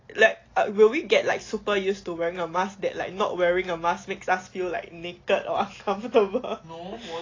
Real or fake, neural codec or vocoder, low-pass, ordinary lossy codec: real; none; 7.2 kHz; AAC, 32 kbps